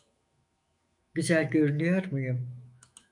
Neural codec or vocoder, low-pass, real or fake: autoencoder, 48 kHz, 128 numbers a frame, DAC-VAE, trained on Japanese speech; 10.8 kHz; fake